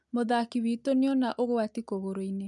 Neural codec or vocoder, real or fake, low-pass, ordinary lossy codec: none; real; 10.8 kHz; none